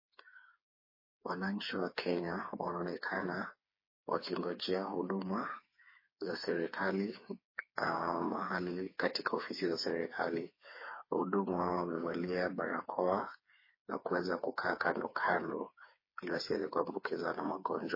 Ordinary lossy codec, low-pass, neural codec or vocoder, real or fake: MP3, 24 kbps; 5.4 kHz; codec, 16 kHz, 4 kbps, FreqCodec, smaller model; fake